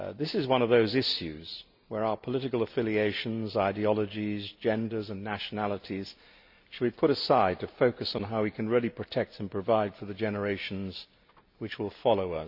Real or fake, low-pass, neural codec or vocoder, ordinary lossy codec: real; 5.4 kHz; none; none